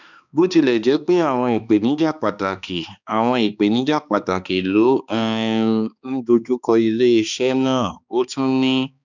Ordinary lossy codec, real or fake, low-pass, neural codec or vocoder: none; fake; 7.2 kHz; codec, 16 kHz, 2 kbps, X-Codec, HuBERT features, trained on balanced general audio